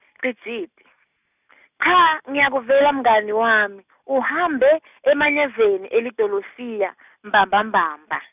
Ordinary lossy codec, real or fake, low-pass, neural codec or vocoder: none; real; 3.6 kHz; none